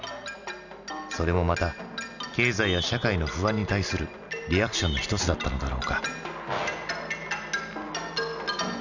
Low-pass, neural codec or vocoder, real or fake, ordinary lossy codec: 7.2 kHz; none; real; none